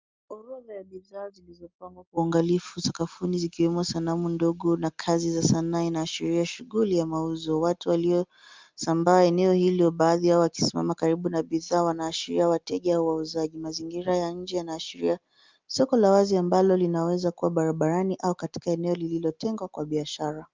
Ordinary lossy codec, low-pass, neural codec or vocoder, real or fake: Opus, 32 kbps; 7.2 kHz; none; real